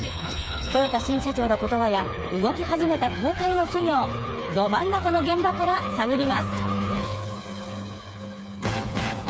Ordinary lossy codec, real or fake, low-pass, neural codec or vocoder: none; fake; none; codec, 16 kHz, 4 kbps, FreqCodec, smaller model